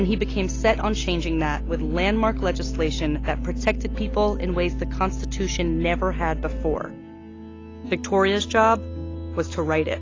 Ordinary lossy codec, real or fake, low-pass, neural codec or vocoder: AAC, 32 kbps; real; 7.2 kHz; none